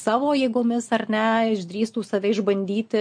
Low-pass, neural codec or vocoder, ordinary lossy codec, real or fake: 9.9 kHz; none; MP3, 48 kbps; real